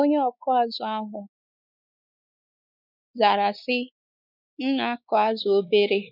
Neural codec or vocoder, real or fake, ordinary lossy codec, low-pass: codec, 16 kHz, 4 kbps, X-Codec, WavLM features, trained on Multilingual LibriSpeech; fake; none; 5.4 kHz